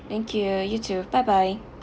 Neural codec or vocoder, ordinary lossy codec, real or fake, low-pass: none; none; real; none